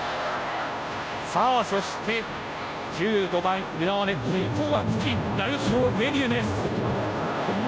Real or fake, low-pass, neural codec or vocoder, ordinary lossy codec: fake; none; codec, 16 kHz, 0.5 kbps, FunCodec, trained on Chinese and English, 25 frames a second; none